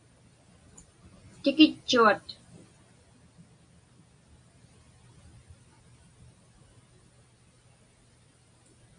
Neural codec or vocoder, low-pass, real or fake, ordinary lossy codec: none; 9.9 kHz; real; MP3, 48 kbps